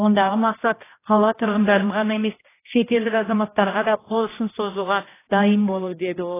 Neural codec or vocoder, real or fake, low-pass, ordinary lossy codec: codec, 16 kHz, 1 kbps, X-Codec, HuBERT features, trained on general audio; fake; 3.6 kHz; AAC, 16 kbps